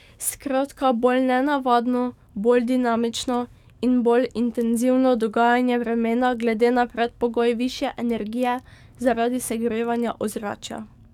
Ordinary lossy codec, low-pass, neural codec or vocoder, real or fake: none; 19.8 kHz; codec, 44.1 kHz, 7.8 kbps, DAC; fake